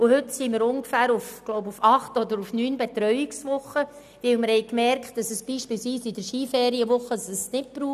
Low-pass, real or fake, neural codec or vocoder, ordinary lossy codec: 14.4 kHz; real; none; none